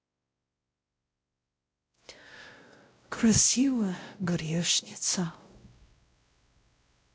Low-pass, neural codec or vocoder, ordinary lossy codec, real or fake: none; codec, 16 kHz, 0.5 kbps, X-Codec, WavLM features, trained on Multilingual LibriSpeech; none; fake